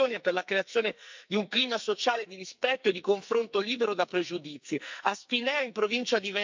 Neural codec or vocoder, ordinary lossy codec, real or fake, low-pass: codec, 44.1 kHz, 2.6 kbps, SNAC; MP3, 64 kbps; fake; 7.2 kHz